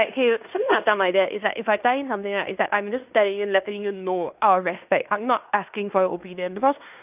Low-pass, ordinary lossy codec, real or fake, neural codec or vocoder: 3.6 kHz; none; fake; codec, 16 kHz in and 24 kHz out, 0.9 kbps, LongCat-Audio-Codec, fine tuned four codebook decoder